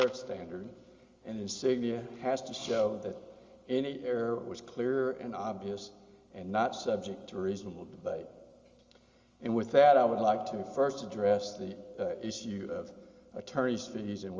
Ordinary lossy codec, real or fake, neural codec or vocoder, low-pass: Opus, 32 kbps; real; none; 7.2 kHz